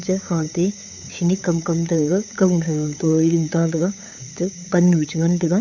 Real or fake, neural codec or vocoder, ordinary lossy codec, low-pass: fake; codec, 16 kHz, 8 kbps, FunCodec, trained on LibriTTS, 25 frames a second; none; 7.2 kHz